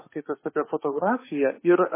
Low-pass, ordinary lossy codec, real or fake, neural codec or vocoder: 3.6 kHz; MP3, 16 kbps; fake; autoencoder, 48 kHz, 32 numbers a frame, DAC-VAE, trained on Japanese speech